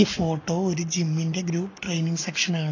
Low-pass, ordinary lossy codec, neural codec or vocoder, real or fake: 7.2 kHz; AAC, 32 kbps; none; real